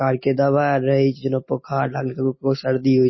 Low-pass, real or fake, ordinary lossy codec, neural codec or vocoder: 7.2 kHz; real; MP3, 24 kbps; none